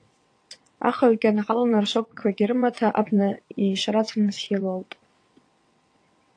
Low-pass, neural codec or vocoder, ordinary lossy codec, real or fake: 9.9 kHz; vocoder, 22.05 kHz, 80 mel bands, WaveNeXt; AAC, 48 kbps; fake